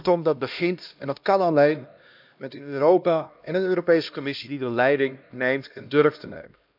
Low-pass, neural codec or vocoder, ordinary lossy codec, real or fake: 5.4 kHz; codec, 16 kHz, 1 kbps, X-Codec, HuBERT features, trained on LibriSpeech; none; fake